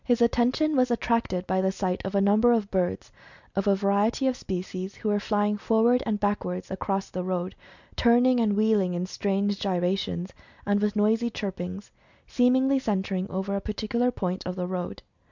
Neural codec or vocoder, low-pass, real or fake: none; 7.2 kHz; real